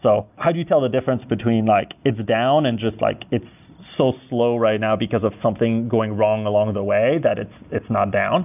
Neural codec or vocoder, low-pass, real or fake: none; 3.6 kHz; real